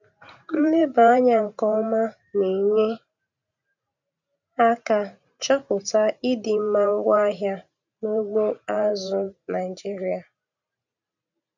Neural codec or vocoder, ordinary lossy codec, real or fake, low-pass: vocoder, 44.1 kHz, 128 mel bands every 512 samples, BigVGAN v2; none; fake; 7.2 kHz